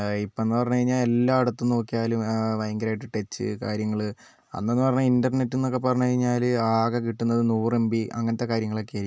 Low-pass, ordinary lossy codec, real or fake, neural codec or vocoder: none; none; real; none